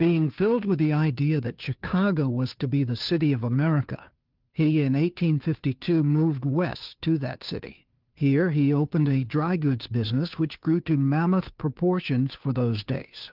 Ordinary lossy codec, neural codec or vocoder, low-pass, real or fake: Opus, 24 kbps; codec, 16 kHz, 2 kbps, FunCodec, trained on Chinese and English, 25 frames a second; 5.4 kHz; fake